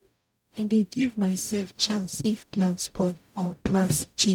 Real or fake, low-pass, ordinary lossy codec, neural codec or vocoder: fake; 19.8 kHz; none; codec, 44.1 kHz, 0.9 kbps, DAC